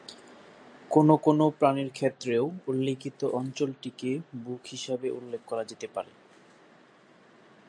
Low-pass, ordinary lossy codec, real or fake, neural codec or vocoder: 9.9 kHz; MP3, 64 kbps; real; none